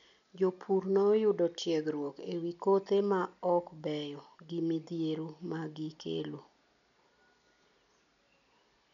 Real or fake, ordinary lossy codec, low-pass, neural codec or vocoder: real; none; 7.2 kHz; none